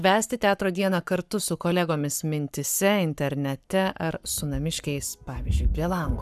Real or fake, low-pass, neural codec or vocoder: fake; 14.4 kHz; codec, 44.1 kHz, 7.8 kbps, Pupu-Codec